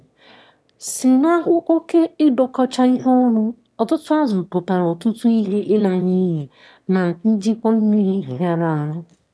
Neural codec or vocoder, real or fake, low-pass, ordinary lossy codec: autoencoder, 22.05 kHz, a latent of 192 numbers a frame, VITS, trained on one speaker; fake; none; none